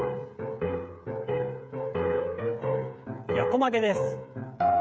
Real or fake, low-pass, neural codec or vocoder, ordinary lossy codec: fake; none; codec, 16 kHz, 8 kbps, FreqCodec, smaller model; none